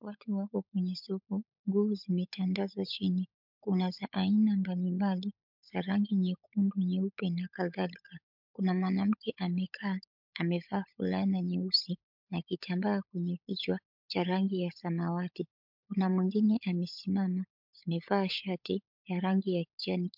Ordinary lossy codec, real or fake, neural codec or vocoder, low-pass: MP3, 48 kbps; fake; codec, 16 kHz, 16 kbps, FunCodec, trained on LibriTTS, 50 frames a second; 5.4 kHz